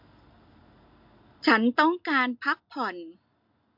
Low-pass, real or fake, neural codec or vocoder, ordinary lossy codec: 5.4 kHz; real; none; MP3, 48 kbps